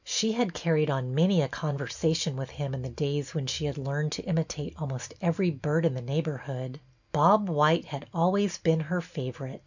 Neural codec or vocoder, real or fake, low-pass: none; real; 7.2 kHz